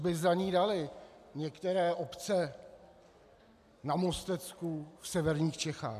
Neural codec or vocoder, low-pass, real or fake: none; 14.4 kHz; real